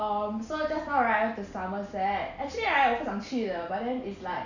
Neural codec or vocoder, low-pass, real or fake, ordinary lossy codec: none; 7.2 kHz; real; none